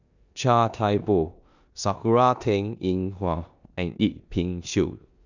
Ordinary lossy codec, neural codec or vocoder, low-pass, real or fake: none; codec, 16 kHz in and 24 kHz out, 0.9 kbps, LongCat-Audio-Codec, four codebook decoder; 7.2 kHz; fake